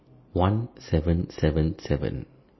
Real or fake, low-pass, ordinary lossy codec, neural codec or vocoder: real; 7.2 kHz; MP3, 24 kbps; none